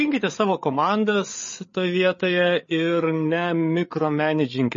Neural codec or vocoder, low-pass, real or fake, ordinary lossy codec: codec, 16 kHz, 8 kbps, FreqCodec, larger model; 7.2 kHz; fake; MP3, 32 kbps